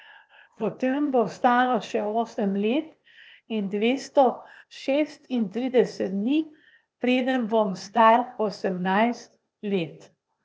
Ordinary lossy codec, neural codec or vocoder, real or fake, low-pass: none; codec, 16 kHz, 0.8 kbps, ZipCodec; fake; none